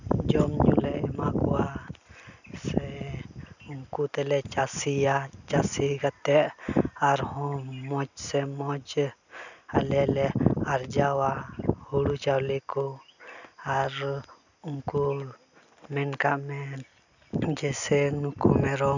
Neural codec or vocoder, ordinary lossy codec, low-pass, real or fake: none; none; 7.2 kHz; real